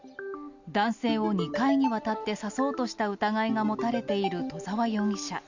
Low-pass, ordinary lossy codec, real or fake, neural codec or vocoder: 7.2 kHz; Opus, 64 kbps; real; none